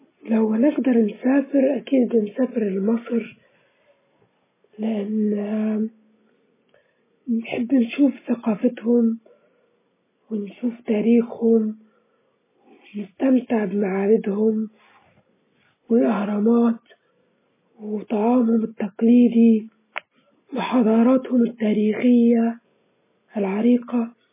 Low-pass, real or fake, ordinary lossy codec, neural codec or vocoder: 3.6 kHz; real; MP3, 16 kbps; none